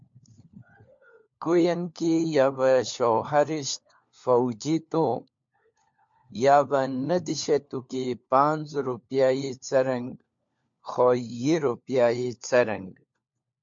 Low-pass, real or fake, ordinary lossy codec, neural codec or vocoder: 7.2 kHz; fake; MP3, 48 kbps; codec, 16 kHz, 4 kbps, FunCodec, trained on LibriTTS, 50 frames a second